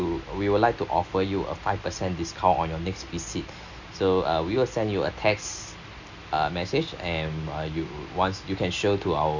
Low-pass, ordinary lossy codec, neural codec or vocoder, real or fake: 7.2 kHz; none; none; real